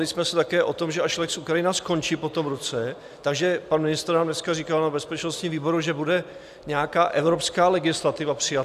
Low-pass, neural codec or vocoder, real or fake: 14.4 kHz; none; real